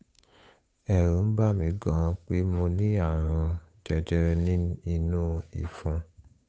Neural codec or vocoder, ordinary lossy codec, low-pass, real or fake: codec, 16 kHz, 8 kbps, FunCodec, trained on Chinese and English, 25 frames a second; none; none; fake